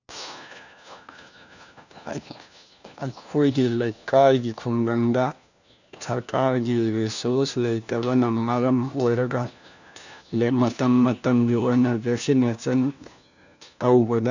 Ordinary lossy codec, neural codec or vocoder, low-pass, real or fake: none; codec, 16 kHz, 1 kbps, FunCodec, trained on LibriTTS, 50 frames a second; 7.2 kHz; fake